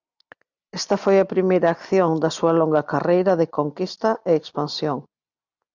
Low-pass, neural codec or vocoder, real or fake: 7.2 kHz; none; real